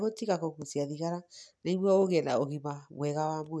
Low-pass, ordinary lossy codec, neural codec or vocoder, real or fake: none; none; none; real